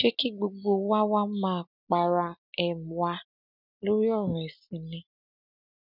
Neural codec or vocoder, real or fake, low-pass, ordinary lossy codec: none; real; 5.4 kHz; none